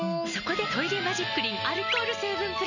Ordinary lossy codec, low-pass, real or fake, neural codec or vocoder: none; 7.2 kHz; real; none